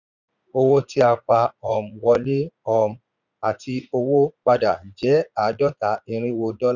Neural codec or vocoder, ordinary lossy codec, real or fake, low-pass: vocoder, 44.1 kHz, 80 mel bands, Vocos; none; fake; 7.2 kHz